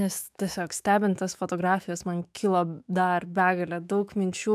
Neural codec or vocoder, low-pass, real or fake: autoencoder, 48 kHz, 128 numbers a frame, DAC-VAE, trained on Japanese speech; 14.4 kHz; fake